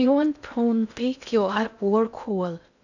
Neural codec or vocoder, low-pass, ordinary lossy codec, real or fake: codec, 16 kHz in and 24 kHz out, 0.6 kbps, FocalCodec, streaming, 2048 codes; 7.2 kHz; none; fake